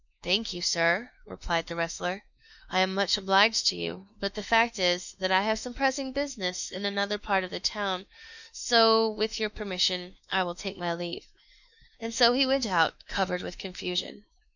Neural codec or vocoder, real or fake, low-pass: autoencoder, 48 kHz, 32 numbers a frame, DAC-VAE, trained on Japanese speech; fake; 7.2 kHz